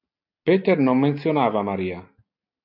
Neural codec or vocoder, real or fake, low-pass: none; real; 5.4 kHz